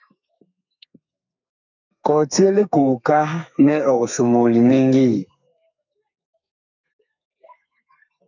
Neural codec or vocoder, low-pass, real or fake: codec, 32 kHz, 1.9 kbps, SNAC; 7.2 kHz; fake